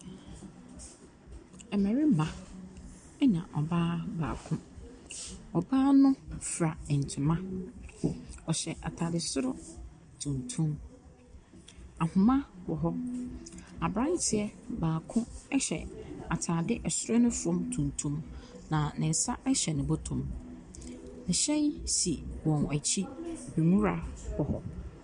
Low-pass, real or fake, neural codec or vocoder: 9.9 kHz; real; none